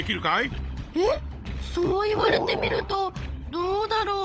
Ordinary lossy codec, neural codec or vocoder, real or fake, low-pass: none; codec, 16 kHz, 16 kbps, FunCodec, trained on LibriTTS, 50 frames a second; fake; none